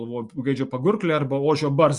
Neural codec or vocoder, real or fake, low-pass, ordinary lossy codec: none; real; 10.8 kHz; Opus, 64 kbps